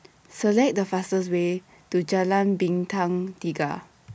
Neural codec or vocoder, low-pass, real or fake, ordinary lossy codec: none; none; real; none